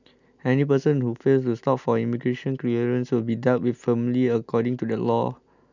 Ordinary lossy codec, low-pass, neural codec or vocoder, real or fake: none; 7.2 kHz; none; real